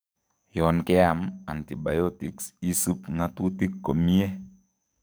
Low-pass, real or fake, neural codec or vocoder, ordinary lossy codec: none; fake; codec, 44.1 kHz, 7.8 kbps, DAC; none